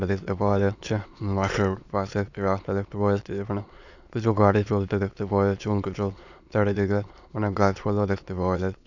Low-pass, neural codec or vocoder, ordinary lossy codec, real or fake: 7.2 kHz; autoencoder, 22.05 kHz, a latent of 192 numbers a frame, VITS, trained on many speakers; none; fake